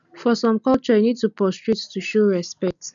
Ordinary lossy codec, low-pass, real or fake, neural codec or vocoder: none; 7.2 kHz; real; none